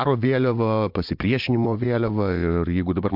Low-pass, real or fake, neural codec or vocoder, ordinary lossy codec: 5.4 kHz; fake; vocoder, 22.05 kHz, 80 mel bands, Vocos; MP3, 48 kbps